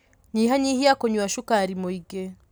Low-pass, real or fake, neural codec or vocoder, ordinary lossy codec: none; real; none; none